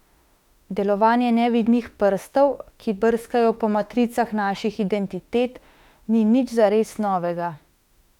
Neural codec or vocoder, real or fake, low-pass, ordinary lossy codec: autoencoder, 48 kHz, 32 numbers a frame, DAC-VAE, trained on Japanese speech; fake; 19.8 kHz; none